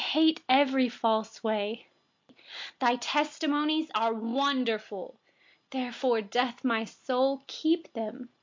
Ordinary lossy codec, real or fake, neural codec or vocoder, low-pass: MP3, 48 kbps; real; none; 7.2 kHz